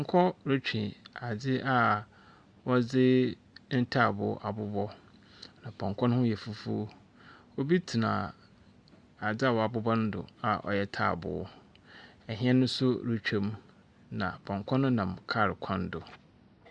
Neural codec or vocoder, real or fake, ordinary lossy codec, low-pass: none; real; Opus, 64 kbps; 9.9 kHz